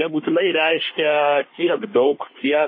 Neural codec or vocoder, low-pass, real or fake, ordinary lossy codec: codec, 24 kHz, 1 kbps, SNAC; 5.4 kHz; fake; MP3, 24 kbps